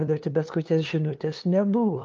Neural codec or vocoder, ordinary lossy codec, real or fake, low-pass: codec, 16 kHz, 2 kbps, FunCodec, trained on LibriTTS, 25 frames a second; Opus, 24 kbps; fake; 7.2 kHz